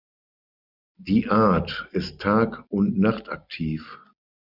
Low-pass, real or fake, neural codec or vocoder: 5.4 kHz; real; none